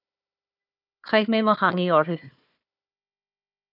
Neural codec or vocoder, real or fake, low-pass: codec, 16 kHz, 4 kbps, FunCodec, trained on Chinese and English, 50 frames a second; fake; 5.4 kHz